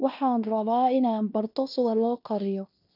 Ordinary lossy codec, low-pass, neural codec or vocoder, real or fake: none; 5.4 kHz; codec, 16 kHz in and 24 kHz out, 0.9 kbps, LongCat-Audio-Codec, fine tuned four codebook decoder; fake